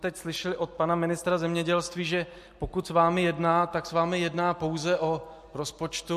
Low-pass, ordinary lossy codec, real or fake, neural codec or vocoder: 14.4 kHz; MP3, 64 kbps; real; none